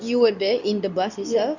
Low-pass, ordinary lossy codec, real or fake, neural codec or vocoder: 7.2 kHz; none; fake; codec, 24 kHz, 0.9 kbps, WavTokenizer, medium speech release version 2